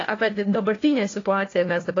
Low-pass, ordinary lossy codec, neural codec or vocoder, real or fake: 7.2 kHz; AAC, 32 kbps; codec, 16 kHz, 1 kbps, FunCodec, trained on LibriTTS, 50 frames a second; fake